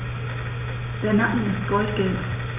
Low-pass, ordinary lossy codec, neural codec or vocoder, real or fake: 3.6 kHz; none; none; real